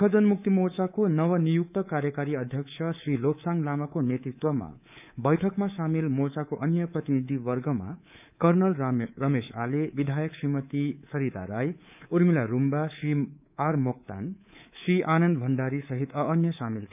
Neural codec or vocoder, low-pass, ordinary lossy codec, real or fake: codec, 24 kHz, 3.1 kbps, DualCodec; 3.6 kHz; none; fake